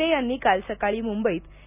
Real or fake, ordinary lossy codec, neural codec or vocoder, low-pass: real; none; none; 3.6 kHz